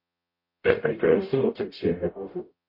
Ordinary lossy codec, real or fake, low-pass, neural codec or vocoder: MP3, 32 kbps; fake; 5.4 kHz; codec, 44.1 kHz, 0.9 kbps, DAC